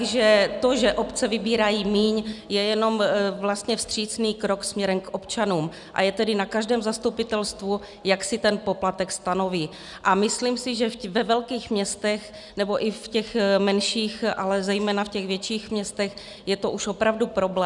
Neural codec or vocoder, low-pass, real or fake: none; 10.8 kHz; real